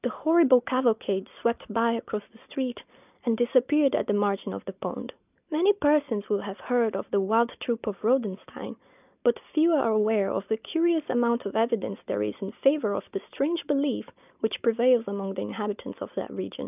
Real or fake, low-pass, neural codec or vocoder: real; 3.6 kHz; none